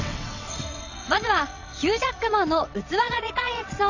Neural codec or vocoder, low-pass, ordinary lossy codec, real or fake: vocoder, 22.05 kHz, 80 mel bands, WaveNeXt; 7.2 kHz; none; fake